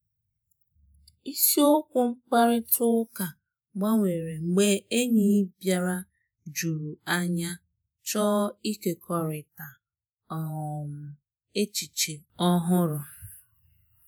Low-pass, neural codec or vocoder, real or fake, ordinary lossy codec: none; vocoder, 48 kHz, 128 mel bands, Vocos; fake; none